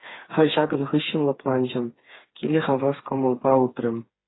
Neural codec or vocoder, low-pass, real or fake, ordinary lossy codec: codec, 16 kHz, 4 kbps, FreqCodec, smaller model; 7.2 kHz; fake; AAC, 16 kbps